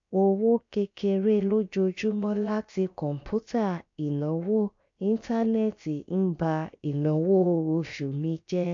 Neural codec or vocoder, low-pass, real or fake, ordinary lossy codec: codec, 16 kHz, about 1 kbps, DyCAST, with the encoder's durations; 7.2 kHz; fake; AAC, 64 kbps